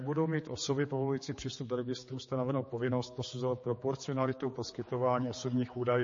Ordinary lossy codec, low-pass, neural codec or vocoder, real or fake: MP3, 32 kbps; 7.2 kHz; codec, 16 kHz, 4 kbps, X-Codec, HuBERT features, trained on general audio; fake